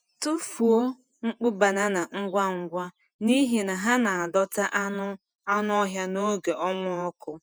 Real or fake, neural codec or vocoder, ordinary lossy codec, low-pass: fake; vocoder, 48 kHz, 128 mel bands, Vocos; none; none